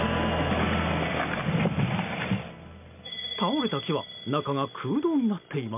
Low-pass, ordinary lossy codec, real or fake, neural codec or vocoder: 3.6 kHz; MP3, 32 kbps; real; none